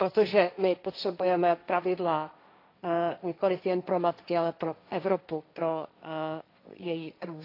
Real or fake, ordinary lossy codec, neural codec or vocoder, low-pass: fake; AAC, 32 kbps; codec, 16 kHz, 1.1 kbps, Voila-Tokenizer; 5.4 kHz